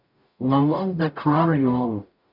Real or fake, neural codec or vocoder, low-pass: fake; codec, 44.1 kHz, 0.9 kbps, DAC; 5.4 kHz